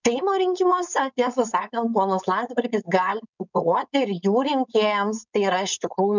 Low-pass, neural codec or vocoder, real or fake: 7.2 kHz; codec, 16 kHz, 4.8 kbps, FACodec; fake